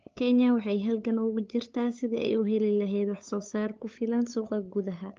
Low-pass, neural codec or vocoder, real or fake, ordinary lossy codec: 7.2 kHz; codec, 16 kHz, 8 kbps, FunCodec, trained on LibriTTS, 25 frames a second; fake; Opus, 24 kbps